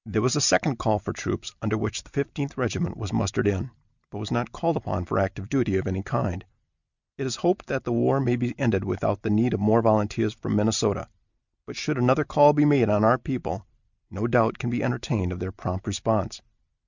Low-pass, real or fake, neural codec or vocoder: 7.2 kHz; real; none